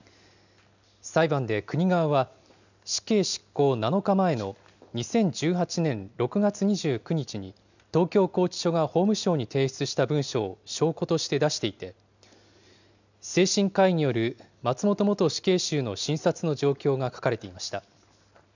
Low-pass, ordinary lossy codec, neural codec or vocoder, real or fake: 7.2 kHz; none; none; real